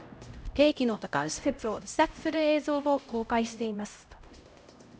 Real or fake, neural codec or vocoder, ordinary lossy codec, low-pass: fake; codec, 16 kHz, 0.5 kbps, X-Codec, HuBERT features, trained on LibriSpeech; none; none